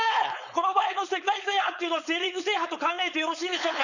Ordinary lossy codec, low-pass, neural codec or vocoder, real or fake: none; 7.2 kHz; codec, 16 kHz, 4.8 kbps, FACodec; fake